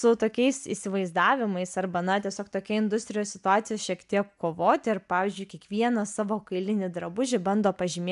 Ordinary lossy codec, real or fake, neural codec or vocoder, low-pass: AAC, 96 kbps; real; none; 10.8 kHz